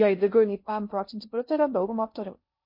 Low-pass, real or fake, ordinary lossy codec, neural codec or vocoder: 5.4 kHz; fake; MP3, 32 kbps; codec, 16 kHz in and 24 kHz out, 0.6 kbps, FocalCodec, streaming, 2048 codes